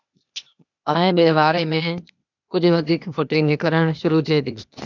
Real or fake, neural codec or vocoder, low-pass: fake; codec, 16 kHz, 0.8 kbps, ZipCodec; 7.2 kHz